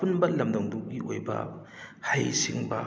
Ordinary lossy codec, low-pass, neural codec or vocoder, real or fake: none; none; none; real